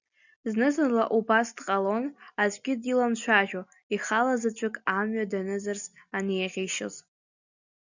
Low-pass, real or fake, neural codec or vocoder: 7.2 kHz; real; none